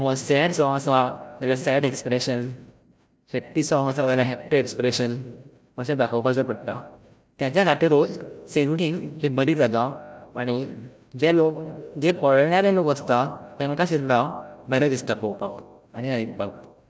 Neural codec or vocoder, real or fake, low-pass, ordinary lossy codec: codec, 16 kHz, 0.5 kbps, FreqCodec, larger model; fake; none; none